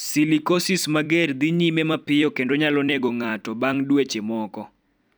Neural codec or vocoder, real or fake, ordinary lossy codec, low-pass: vocoder, 44.1 kHz, 128 mel bands every 256 samples, BigVGAN v2; fake; none; none